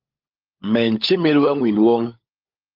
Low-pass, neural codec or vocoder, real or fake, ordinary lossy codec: 5.4 kHz; codec, 16 kHz, 16 kbps, FunCodec, trained on LibriTTS, 50 frames a second; fake; Opus, 24 kbps